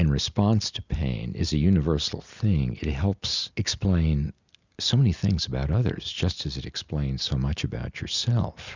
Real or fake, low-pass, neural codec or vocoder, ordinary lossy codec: real; 7.2 kHz; none; Opus, 64 kbps